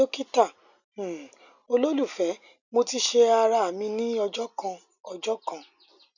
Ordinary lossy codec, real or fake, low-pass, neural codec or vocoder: none; real; 7.2 kHz; none